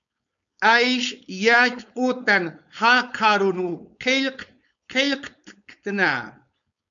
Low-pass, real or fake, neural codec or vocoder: 7.2 kHz; fake; codec, 16 kHz, 4.8 kbps, FACodec